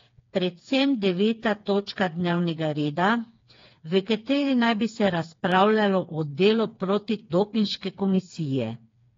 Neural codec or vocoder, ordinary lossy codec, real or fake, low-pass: codec, 16 kHz, 4 kbps, FreqCodec, smaller model; AAC, 32 kbps; fake; 7.2 kHz